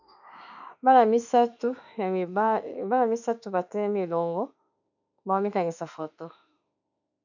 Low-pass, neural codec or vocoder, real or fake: 7.2 kHz; autoencoder, 48 kHz, 32 numbers a frame, DAC-VAE, trained on Japanese speech; fake